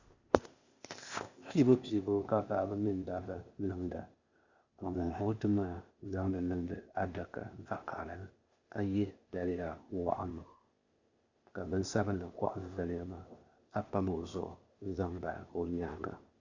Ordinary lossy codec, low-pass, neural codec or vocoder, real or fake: Opus, 64 kbps; 7.2 kHz; codec, 16 kHz, 0.8 kbps, ZipCodec; fake